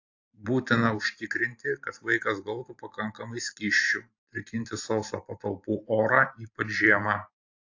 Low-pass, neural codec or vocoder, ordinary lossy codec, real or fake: 7.2 kHz; vocoder, 44.1 kHz, 128 mel bands every 512 samples, BigVGAN v2; AAC, 48 kbps; fake